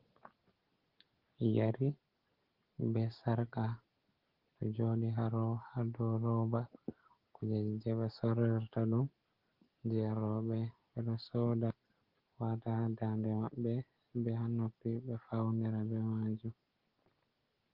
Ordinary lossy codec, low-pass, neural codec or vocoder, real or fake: Opus, 16 kbps; 5.4 kHz; none; real